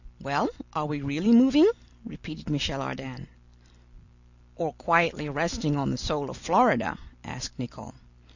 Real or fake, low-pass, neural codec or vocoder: real; 7.2 kHz; none